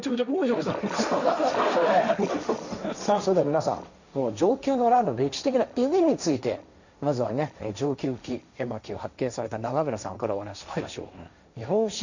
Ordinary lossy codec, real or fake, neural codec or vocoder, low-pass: none; fake; codec, 16 kHz, 1.1 kbps, Voila-Tokenizer; 7.2 kHz